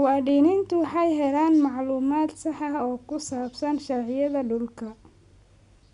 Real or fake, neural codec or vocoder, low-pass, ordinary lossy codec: real; none; 10.8 kHz; none